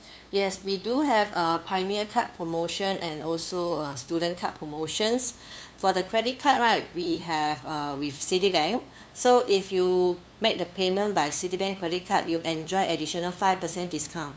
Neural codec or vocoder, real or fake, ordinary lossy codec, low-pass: codec, 16 kHz, 2 kbps, FunCodec, trained on LibriTTS, 25 frames a second; fake; none; none